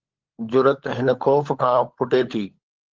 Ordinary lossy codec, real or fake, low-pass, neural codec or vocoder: Opus, 16 kbps; fake; 7.2 kHz; codec, 16 kHz, 16 kbps, FunCodec, trained on LibriTTS, 50 frames a second